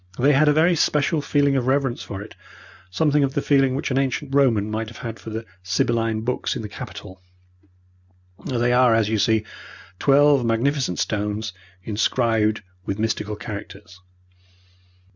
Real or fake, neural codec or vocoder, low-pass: real; none; 7.2 kHz